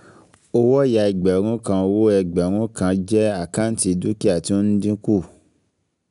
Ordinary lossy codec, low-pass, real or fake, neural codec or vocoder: none; 10.8 kHz; real; none